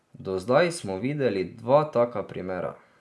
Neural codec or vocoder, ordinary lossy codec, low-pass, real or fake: none; none; none; real